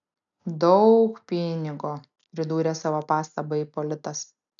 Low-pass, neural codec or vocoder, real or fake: 7.2 kHz; none; real